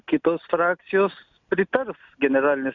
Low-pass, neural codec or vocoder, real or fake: 7.2 kHz; none; real